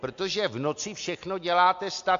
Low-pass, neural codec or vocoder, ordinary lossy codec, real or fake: 7.2 kHz; none; AAC, 64 kbps; real